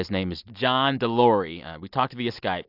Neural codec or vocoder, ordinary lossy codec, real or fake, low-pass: none; AAC, 48 kbps; real; 5.4 kHz